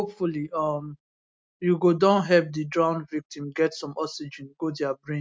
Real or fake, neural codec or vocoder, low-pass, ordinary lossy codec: real; none; none; none